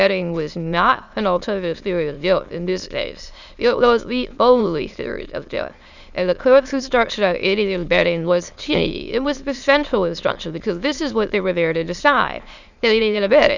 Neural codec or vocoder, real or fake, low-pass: autoencoder, 22.05 kHz, a latent of 192 numbers a frame, VITS, trained on many speakers; fake; 7.2 kHz